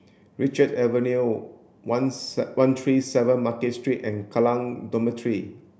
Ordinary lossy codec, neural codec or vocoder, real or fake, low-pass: none; none; real; none